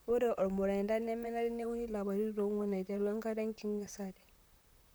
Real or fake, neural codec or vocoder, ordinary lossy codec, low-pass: fake; vocoder, 44.1 kHz, 128 mel bands, Pupu-Vocoder; none; none